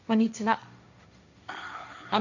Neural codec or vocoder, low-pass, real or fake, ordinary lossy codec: codec, 16 kHz, 1.1 kbps, Voila-Tokenizer; 7.2 kHz; fake; none